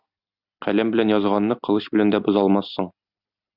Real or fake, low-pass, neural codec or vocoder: real; 5.4 kHz; none